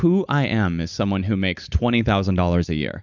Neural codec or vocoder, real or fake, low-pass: none; real; 7.2 kHz